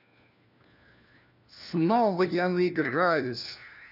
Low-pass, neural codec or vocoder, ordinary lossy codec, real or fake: 5.4 kHz; codec, 16 kHz, 1 kbps, FunCodec, trained on LibriTTS, 50 frames a second; none; fake